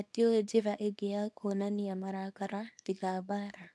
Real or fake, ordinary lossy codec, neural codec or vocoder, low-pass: fake; none; codec, 24 kHz, 0.9 kbps, WavTokenizer, small release; none